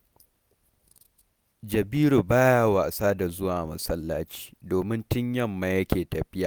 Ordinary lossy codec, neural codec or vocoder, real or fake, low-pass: none; none; real; none